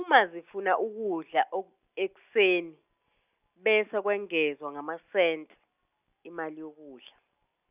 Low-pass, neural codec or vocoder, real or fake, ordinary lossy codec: 3.6 kHz; none; real; none